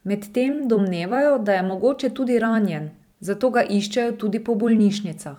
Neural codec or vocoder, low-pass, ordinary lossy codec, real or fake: vocoder, 44.1 kHz, 128 mel bands every 256 samples, BigVGAN v2; 19.8 kHz; none; fake